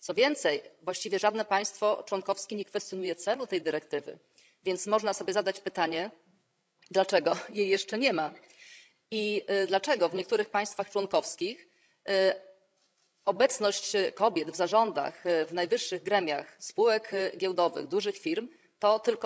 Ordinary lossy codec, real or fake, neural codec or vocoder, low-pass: none; fake; codec, 16 kHz, 16 kbps, FreqCodec, larger model; none